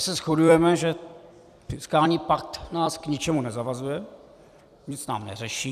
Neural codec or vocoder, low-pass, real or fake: vocoder, 44.1 kHz, 128 mel bands every 256 samples, BigVGAN v2; 14.4 kHz; fake